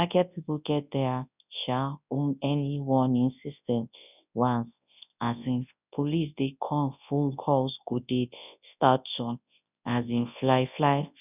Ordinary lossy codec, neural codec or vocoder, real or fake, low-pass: none; codec, 24 kHz, 0.9 kbps, WavTokenizer, large speech release; fake; 3.6 kHz